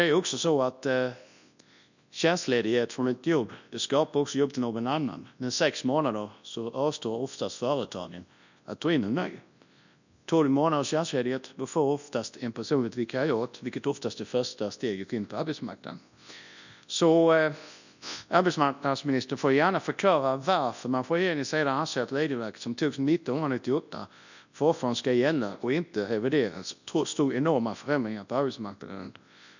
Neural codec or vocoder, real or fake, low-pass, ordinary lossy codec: codec, 24 kHz, 0.9 kbps, WavTokenizer, large speech release; fake; 7.2 kHz; none